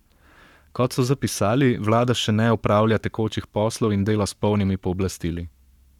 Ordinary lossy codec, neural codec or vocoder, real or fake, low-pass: none; codec, 44.1 kHz, 7.8 kbps, Pupu-Codec; fake; 19.8 kHz